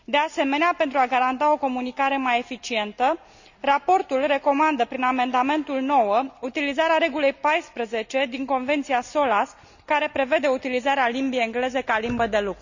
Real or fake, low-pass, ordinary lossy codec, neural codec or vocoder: real; 7.2 kHz; none; none